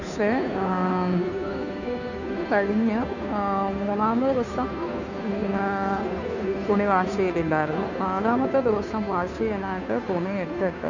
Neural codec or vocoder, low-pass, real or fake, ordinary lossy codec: codec, 16 kHz, 2 kbps, FunCodec, trained on Chinese and English, 25 frames a second; 7.2 kHz; fake; none